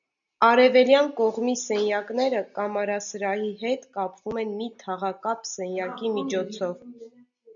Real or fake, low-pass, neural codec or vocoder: real; 7.2 kHz; none